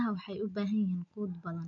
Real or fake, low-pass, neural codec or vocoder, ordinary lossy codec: real; 7.2 kHz; none; MP3, 96 kbps